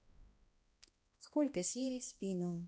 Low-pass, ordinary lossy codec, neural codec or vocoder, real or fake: none; none; codec, 16 kHz, 1 kbps, X-Codec, HuBERT features, trained on balanced general audio; fake